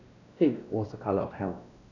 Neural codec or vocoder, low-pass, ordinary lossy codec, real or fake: codec, 16 kHz, 1 kbps, X-Codec, WavLM features, trained on Multilingual LibriSpeech; 7.2 kHz; none; fake